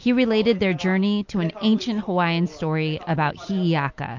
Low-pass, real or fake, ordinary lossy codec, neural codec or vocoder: 7.2 kHz; real; MP3, 48 kbps; none